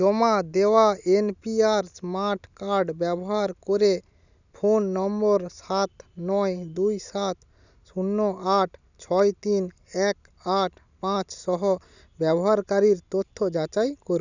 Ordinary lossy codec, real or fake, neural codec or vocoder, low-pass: none; real; none; 7.2 kHz